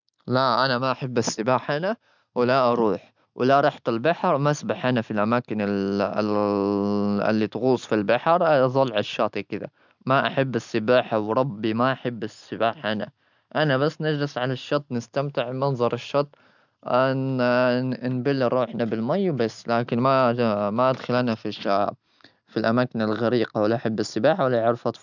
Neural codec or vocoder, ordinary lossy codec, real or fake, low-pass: none; none; real; 7.2 kHz